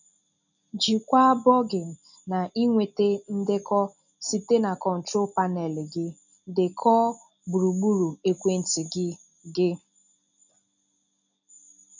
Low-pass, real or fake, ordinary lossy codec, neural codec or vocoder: 7.2 kHz; real; none; none